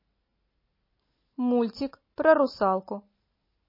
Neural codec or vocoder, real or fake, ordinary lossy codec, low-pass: none; real; MP3, 24 kbps; 5.4 kHz